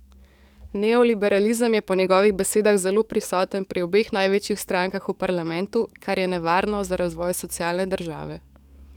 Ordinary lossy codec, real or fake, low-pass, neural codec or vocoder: none; fake; 19.8 kHz; codec, 44.1 kHz, 7.8 kbps, DAC